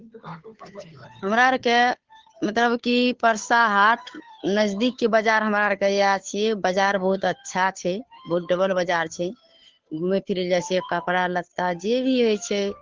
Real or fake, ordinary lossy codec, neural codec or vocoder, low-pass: fake; Opus, 16 kbps; codec, 16 kHz, 2 kbps, FunCodec, trained on Chinese and English, 25 frames a second; 7.2 kHz